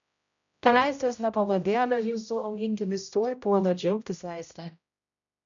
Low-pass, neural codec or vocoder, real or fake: 7.2 kHz; codec, 16 kHz, 0.5 kbps, X-Codec, HuBERT features, trained on general audio; fake